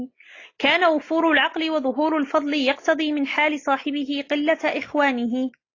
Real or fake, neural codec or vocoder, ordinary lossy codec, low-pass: real; none; AAC, 32 kbps; 7.2 kHz